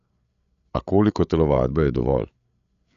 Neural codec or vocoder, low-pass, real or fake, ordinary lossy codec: codec, 16 kHz, 8 kbps, FreqCodec, larger model; 7.2 kHz; fake; none